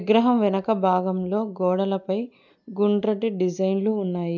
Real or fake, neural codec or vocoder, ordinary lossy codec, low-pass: real; none; MP3, 64 kbps; 7.2 kHz